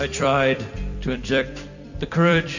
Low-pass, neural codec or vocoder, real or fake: 7.2 kHz; vocoder, 44.1 kHz, 128 mel bands every 256 samples, BigVGAN v2; fake